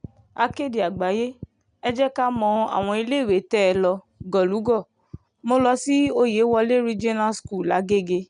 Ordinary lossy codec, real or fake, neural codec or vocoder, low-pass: none; real; none; 9.9 kHz